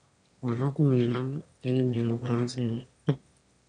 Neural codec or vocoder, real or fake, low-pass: autoencoder, 22.05 kHz, a latent of 192 numbers a frame, VITS, trained on one speaker; fake; 9.9 kHz